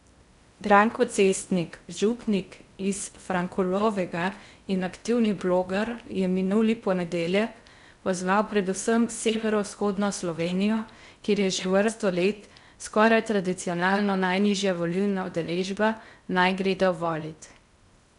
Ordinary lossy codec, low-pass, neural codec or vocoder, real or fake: none; 10.8 kHz; codec, 16 kHz in and 24 kHz out, 0.6 kbps, FocalCodec, streaming, 2048 codes; fake